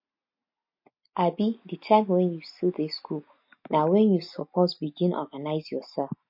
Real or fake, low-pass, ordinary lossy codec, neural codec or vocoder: real; 5.4 kHz; MP3, 32 kbps; none